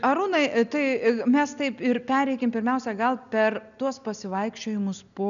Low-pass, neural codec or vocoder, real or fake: 7.2 kHz; none; real